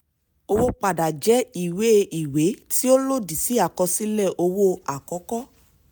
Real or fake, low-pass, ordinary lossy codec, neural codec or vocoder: real; none; none; none